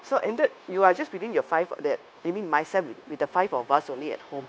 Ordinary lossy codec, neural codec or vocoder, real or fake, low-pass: none; codec, 16 kHz, 0.9 kbps, LongCat-Audio-Codec; fake; none